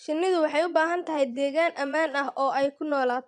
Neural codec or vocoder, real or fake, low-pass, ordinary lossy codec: vocoder, 22.05 kHz, 80 mel bands, Vocos; fake; 9.9 kHz; none